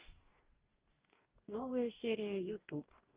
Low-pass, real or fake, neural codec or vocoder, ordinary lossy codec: 3.6 kHz; fake; codec, 44.1 kHz, 2.6 kbps, DAC; Opus, 32 kbps